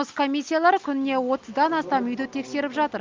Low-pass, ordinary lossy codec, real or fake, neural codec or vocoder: 7.2 kHz; Opus, 24 kbps; real; none